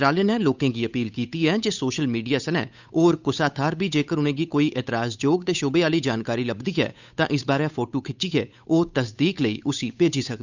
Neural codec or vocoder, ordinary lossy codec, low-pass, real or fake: codec, 16 kHz, 8 kbps, FunCodec, trained on Chinese and English, 25 frames a second; none; 7.2 kHz; fake